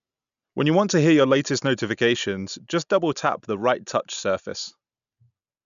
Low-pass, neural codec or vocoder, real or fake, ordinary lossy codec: 7.2 kHz; none; real; none